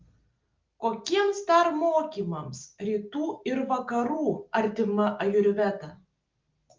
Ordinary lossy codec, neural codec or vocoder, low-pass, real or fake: Opus, 32 kbps; none; 7.2 kHz; real